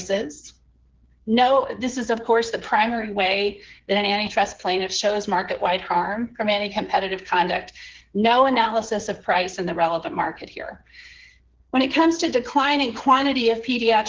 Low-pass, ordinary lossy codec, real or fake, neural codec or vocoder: 7.2 kHz; Opus, 16 kbps; fake; codec, 16 kHz, 8 kbps, FreqCodec, smaller model